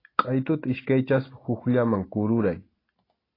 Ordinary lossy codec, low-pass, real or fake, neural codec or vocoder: AAC, 24 kbps; 5.4 kHz; real; none